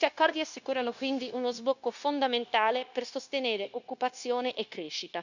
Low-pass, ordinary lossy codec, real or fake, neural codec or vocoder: 7.2 kHz; none; fake; codec, 16 kHz, 0.9 kbps, LongCat-Audio-Codec